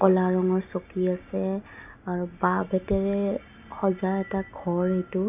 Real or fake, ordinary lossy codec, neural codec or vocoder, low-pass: real; none; none; 3.6 kHz